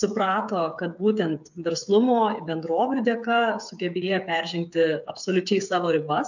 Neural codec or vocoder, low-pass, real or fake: codec, 16 kHz, 8 kbps, FreqCodec, smaller model; 7.2 kHz; fake